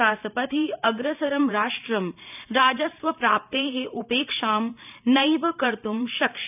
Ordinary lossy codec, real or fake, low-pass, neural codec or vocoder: none; fake; 3.6 kHz; vocoder, 44.1 kHz, 128 mel bands every 512 samples, BigVGAN v2